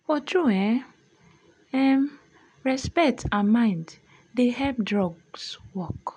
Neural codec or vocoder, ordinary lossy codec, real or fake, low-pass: none; none; real; 9.9 kHz